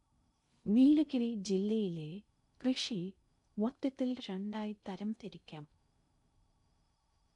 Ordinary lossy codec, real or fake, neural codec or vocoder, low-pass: none; fake; codec, 16 kHz in and 24 kHz out, 0.6 kbps, FocalCodec, streaming, 2048 codes; 10.8 kHz